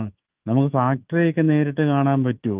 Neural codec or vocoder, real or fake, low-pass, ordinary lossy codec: autoencoder, 48 kHz, 128 numbers a frame, DAC-VAE, trained on Japanese speech; fake; 3.6 kHz; Opus, 32 kbps